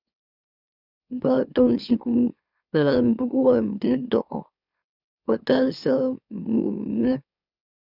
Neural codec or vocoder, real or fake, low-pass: autoencoder, 44.1 kHz, a latent of 192 numbers a frame, MeloTTS; fake; 5.4 kHz